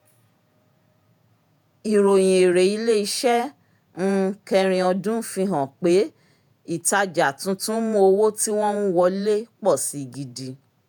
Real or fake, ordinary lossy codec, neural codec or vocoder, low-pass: fake; none; vocoder, 48 kHz, 128 mel bands, Vocos; none